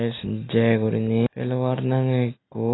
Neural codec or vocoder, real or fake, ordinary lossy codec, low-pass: none; real; AAC, 16 kbps; 7.2 kHz